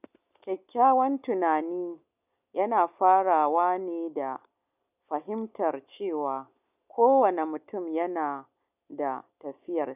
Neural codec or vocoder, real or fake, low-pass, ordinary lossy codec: none; real; 3.6 kHz; none